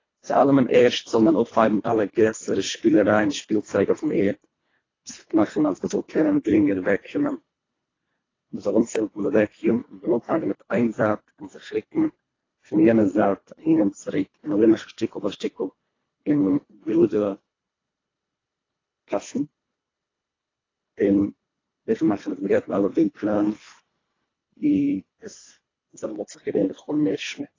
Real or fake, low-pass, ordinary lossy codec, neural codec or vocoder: fake; 7.2 kHz; AAC, 32 kbps; codec, 24 kHz, 1.5 kbps, HILCodec